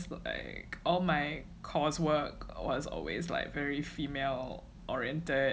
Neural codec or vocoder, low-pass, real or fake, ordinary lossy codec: none; none; real; none